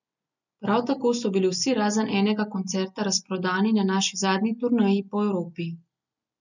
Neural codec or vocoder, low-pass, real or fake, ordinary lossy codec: none; 7.2 kHz; real; none